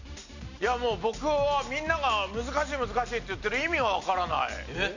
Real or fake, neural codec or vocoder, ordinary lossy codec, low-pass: real; none; none; 7.2 kHz